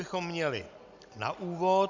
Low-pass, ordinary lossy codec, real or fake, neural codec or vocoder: 7.2 kHz; Opus, 64 kbps; real; none